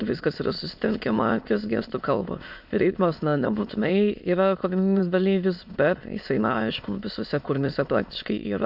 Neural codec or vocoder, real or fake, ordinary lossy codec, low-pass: autoencoder, 22.05 kHz, a latent of 192 numbers a frame, VITS, trained on many speakers; fake; MP3, 48 kbps; 5.4 kHz